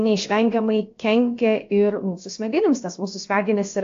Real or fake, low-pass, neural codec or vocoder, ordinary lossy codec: fake; 7.2 kHz; codec, 16 kHz, about 1 kbps, DyCAST, with the encoder's durations; AAC, 48 kbps